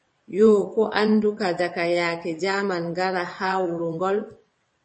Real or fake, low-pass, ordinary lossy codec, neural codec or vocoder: fake; 9.9 kHz; MP3, 32 kbps; vocoder, 22.05 kHz, 80 mel bands, WaveNeXt